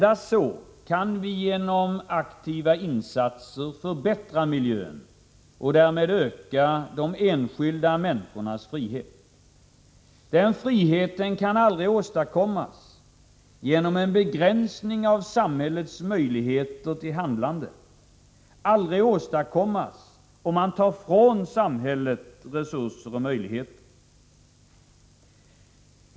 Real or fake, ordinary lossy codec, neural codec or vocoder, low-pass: real; none; none; none